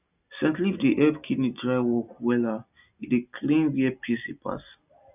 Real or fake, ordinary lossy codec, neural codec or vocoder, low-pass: real; none; none; 3.6 kHz